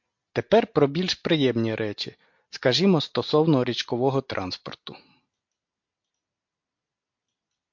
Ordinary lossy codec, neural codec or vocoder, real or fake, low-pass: MP3, 48 kbps; none; real; 7.2 kHz